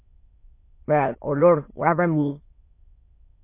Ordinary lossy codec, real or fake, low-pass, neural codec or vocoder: MP3, 24 kbps; fake; 3.6 kHz; autoencoder, 22.05 kHz, a latent of 192 numbers a frame, VITS, trained on many speakers